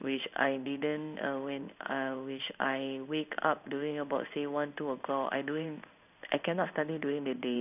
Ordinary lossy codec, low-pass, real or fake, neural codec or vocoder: none; 3.6 kHz; fake; codec, 16 kHz in and 24 kHz out, 1 kbps, XY-Tokenizer